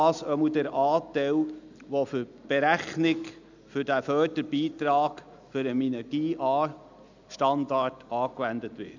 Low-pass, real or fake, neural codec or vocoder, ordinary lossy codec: 7.2 kHz; real; none; none